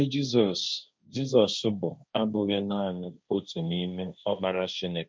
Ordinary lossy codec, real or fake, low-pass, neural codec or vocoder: none; fake; none; codec, 16 kHz, 1.1 kbps, Voila-Tokenizer